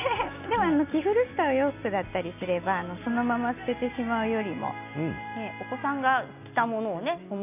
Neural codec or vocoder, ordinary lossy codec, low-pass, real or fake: none; none; 3.6 kHz; real